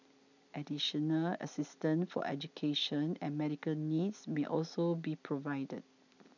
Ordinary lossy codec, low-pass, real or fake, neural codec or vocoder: none; 7.2 kHz; real; none